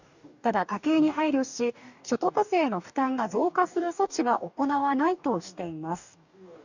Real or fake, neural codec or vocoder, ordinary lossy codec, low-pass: fake; codec, 44.1 kHz, 2.6 kbps, DAC; none; 7.2 kHz